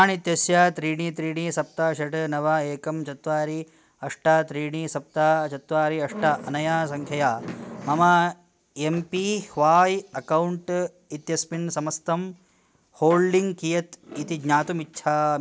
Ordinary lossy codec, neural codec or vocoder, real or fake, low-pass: none; none; real; none